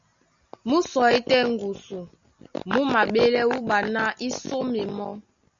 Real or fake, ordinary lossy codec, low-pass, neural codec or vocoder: real; Opus, 64 kbps; 7.2 kHz; none